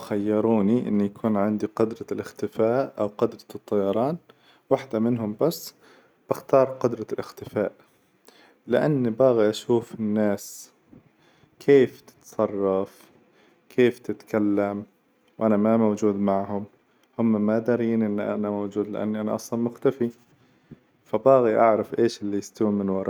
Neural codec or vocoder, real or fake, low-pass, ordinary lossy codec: none; real; none; none